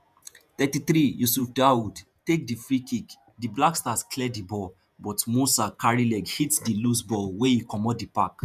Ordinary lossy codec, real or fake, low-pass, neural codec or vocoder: none; fake; 14.4 kHz; vocoder, 48 kHz, 128 mel bands, Vocos